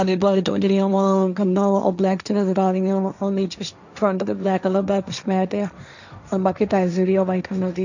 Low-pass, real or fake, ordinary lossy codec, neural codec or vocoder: 7.2 kHz; fake; none; codec, 16 kHz, 1.1 kbps, Voila-Tokenizer